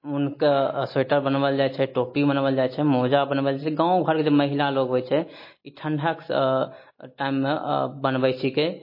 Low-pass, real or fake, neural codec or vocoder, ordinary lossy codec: 5.4 kHz; real; none; MP3, 24 kbps